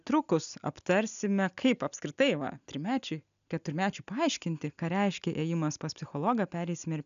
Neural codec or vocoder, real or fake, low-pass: none; real; 7.2 kHz